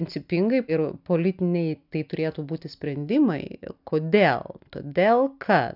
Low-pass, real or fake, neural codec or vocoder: 5.4 kHz; real; none